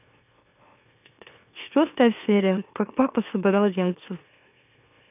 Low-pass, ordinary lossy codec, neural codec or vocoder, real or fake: 3.6 kHz; none; autoencoder, 44.1 kHz, a latent of 192 numbers a frame, MeloTTS; fake